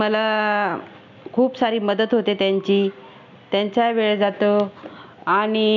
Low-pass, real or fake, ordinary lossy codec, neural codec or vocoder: 7.2 kHz; real; none; none